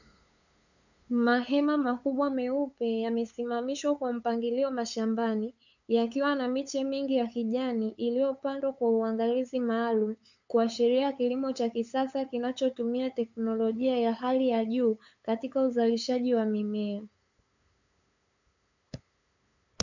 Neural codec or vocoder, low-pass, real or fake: codec, 16 kHz, 8 kbps, FunCodec, trained on LibriTTS, 25 frames a second; 7.2 kHz; fake